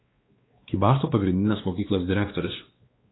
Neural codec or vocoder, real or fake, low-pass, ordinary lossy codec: codec, 16 kHz, 2 kbps, X-Codec, WavLM features, trained on Multilingual LibriSpeech; fake; 7.2 kHz; AAC, 16 kbps